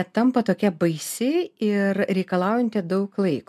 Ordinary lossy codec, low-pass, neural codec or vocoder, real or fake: MP3, 96 kbps; 14.4 kHz; none; real